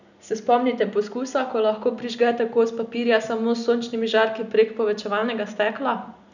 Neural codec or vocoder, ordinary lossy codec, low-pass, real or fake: none; none; 7.2 kHz; real